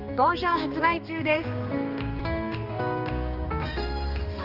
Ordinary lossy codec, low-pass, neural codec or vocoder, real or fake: Opus, 24 kbps; 5.4 kHz; codec, 16 kHz, 2 kbps, X-Codec, HuBERT features, trained on balanced general audio; fake